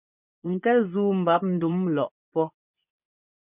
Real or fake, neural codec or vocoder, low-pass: fake; vocoder, 24 kHz, 100 mel bands, Vocos; 3.6 kHz